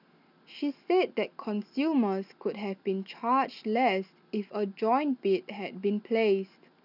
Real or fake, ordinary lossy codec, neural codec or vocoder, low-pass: real; none; none; 5.4 kHz